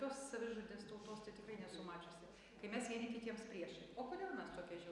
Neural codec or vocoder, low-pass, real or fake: none; 10.8 kHz; real